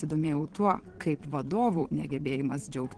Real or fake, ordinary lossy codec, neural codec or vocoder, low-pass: fake; Opus, 16 kbps; vocoder, 22.05 kHz, 80 mel bands, Vocos; 9.9 kHz